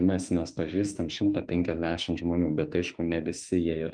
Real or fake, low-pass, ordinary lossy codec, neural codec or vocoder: fake; 9.9 kHz; Opus, 32 kbps; autoencoder, 48 kHz, 32 numbers a frame, DAC-VAE, trained on Japanese speech